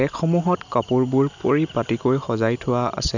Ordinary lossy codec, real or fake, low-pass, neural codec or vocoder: none; real; 7.2 kHz; none